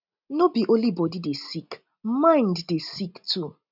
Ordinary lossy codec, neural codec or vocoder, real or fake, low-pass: none; none; real; 5.4 kHz